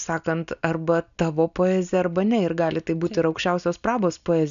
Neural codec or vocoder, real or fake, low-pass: none; real; 7.2 kHz